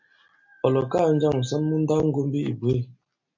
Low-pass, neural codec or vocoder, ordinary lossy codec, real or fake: 7.2 kHz; none; MP3, 64 kbps; real